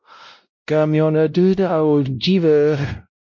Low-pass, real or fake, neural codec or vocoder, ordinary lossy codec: 7.2 kHz; fake; codec, 16 kHz, 0.5 kbps, X-Codec, WavLM features, trained on Multilingual LibriSpeech; MP3, 48 kbps